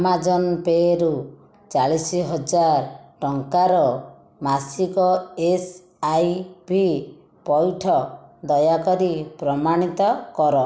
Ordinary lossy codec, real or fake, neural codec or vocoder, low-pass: none; real; none; none